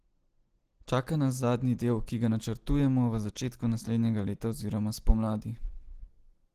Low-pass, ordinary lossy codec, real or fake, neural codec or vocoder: 14.4 kHz; Opus, 16 kbps; real; none